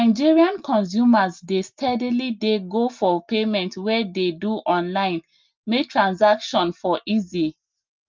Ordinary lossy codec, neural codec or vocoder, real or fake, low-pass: Opus, 24 kbps; none; real; 7.2 kHz